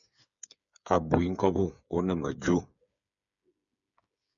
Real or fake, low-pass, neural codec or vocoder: fake; 7.2 kHz; codec, 16 kHz, 8 kbps, FreqCodec, smaller model